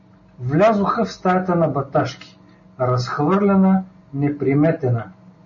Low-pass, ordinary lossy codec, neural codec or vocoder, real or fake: 7.2 kHz; MP3, 32 kbps; none; real